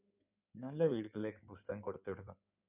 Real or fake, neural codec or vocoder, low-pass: fake; codec, 16 kHz in and 24 kHz out, 2.2 kbps, FireRedTTS-2 codec; 3.6 kHz